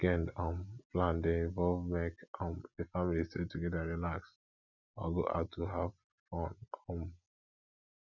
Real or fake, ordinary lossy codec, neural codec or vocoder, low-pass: real; none; none; 7.2 kHz